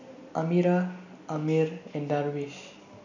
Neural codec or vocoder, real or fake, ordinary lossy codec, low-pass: none; real; none; 7.2 kHz